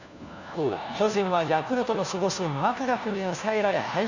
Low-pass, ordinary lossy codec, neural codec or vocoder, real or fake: 7.2 kHz; none; codec, 16 kHz, 1 kbps, FunCodec, trained on LibriTTS, 50 frames a second; fake